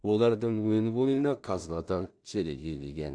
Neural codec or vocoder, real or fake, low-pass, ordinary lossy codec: codec, 16 kHz in and 24 kHz out, 0.4 kbps, LongCat-Audio-Codec, two codebook decoder; fake; 9.9 kHz; AAC, 64 kbps